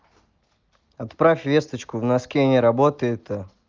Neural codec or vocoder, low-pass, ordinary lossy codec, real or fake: none; 7.2 kHz; Opus, 32 kbps; real